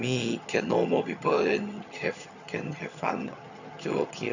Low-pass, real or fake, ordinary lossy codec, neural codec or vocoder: 7.2 kHz; fake; none; vocoder, 22.05 kHz, 80 mel bands, HiFi-GAN